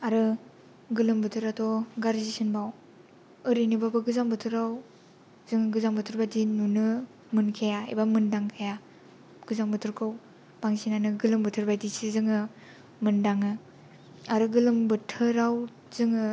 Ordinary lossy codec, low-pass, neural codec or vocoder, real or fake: none; none; none; real